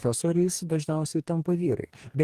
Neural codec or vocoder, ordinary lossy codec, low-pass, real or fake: codec, 44.1 kHz, 2.6 kbps, DAC; Opus, 24 kbps; 14.4 kHz; fake